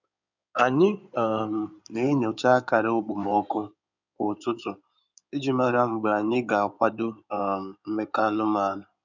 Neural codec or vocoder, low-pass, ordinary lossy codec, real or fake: codec, 16 kHz in and 24 kHz out, 2.2 kbps, FireRedTTS-2 codec; 7.2 kHz; none; fake